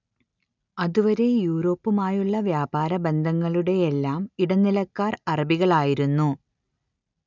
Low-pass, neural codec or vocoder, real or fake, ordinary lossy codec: 7.2 kHz; none; real; none